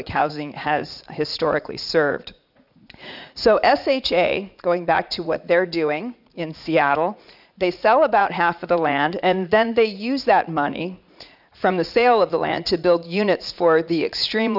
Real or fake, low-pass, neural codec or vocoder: fake; 5.4 kHz; vocoder, 44.1 kHz, 80 mel bands, Vocos